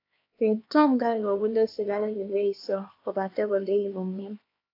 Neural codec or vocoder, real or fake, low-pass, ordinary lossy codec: codec, 16 kHz, 2 kbps, X-Codec, HuBERT features, trained on LibriSpeech; fake; 5.4 kHz; AAC, 32 kbps